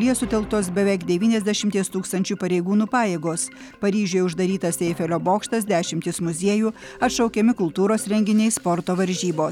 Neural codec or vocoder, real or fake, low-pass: none; real; 19.8 kHz